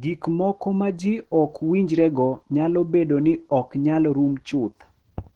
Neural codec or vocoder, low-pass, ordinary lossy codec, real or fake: autoencoder, 48 kHz, 128 numbers a frame, DAC-VAE, trained on Japanese speech; 19.8 kHz; Opus, 16 kbps; fake